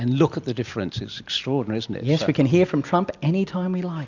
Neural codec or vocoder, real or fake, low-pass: none; real; 7.2 kHz